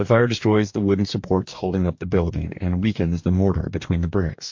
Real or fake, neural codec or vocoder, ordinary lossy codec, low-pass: fake; codec, 44.1 kHz, 2.6 kbps, DAC; MP3, 48 kbps; 7.2 kHz